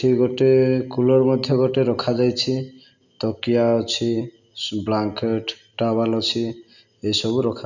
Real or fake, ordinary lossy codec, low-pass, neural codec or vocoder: real; none; 7.2 kHz; none